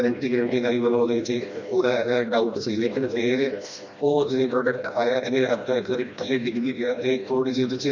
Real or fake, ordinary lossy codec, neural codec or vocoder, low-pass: fake; none; codec, 16 kHz, 1 kbps, FreqCodec, smaller model; 7.2 kHz